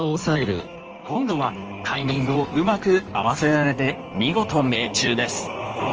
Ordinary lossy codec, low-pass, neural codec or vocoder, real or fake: Opus, 24 kbps; 7.2 kHz; codec, 16 kHz in and 24 kHz out, 1.1 kbps, FireRedTTS-2 codec; fake